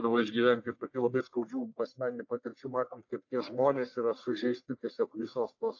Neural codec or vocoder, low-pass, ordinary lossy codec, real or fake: codec, 44.1 kHz, 1.7 kbps, Pupu-Codec; 7.2 kHz; AAC, 48 kbps; fake